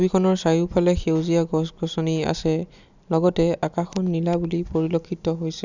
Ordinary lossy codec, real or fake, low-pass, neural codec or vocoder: none; real; 7.2 kHz; none